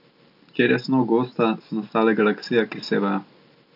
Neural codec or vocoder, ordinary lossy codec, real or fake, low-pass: none; none; real; 5.4 kHz